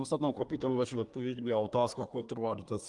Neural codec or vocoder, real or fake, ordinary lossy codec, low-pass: codec, 24 kHz, 1 kbps, SNAC; fake; Opus, 32 kbps; 10.8 kHz